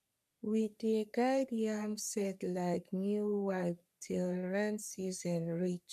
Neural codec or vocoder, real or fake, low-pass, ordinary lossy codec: codec, 44.1 kHz, 3.4 kbps, Pupu-Codec; fake; 14.4 kHz; none